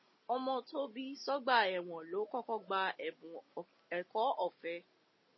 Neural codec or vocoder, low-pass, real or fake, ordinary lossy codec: none; 7.2 kHz; real; MP3, 24 kbps